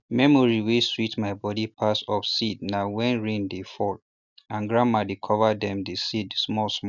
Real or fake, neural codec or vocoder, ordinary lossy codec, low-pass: real; none; none; 7.2 kHz